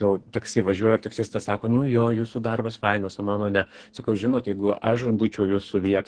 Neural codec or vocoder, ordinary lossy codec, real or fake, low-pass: codec, 44.1 kHz, 2.6 kbps, SNAC; Opus, 16 kbps; fake; 9.9 kHz